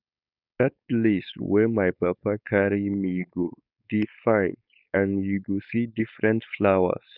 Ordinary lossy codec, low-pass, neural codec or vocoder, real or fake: none; 5.4 kHz; codec, 16 kHz, 4.8 kbps, FACodec; fake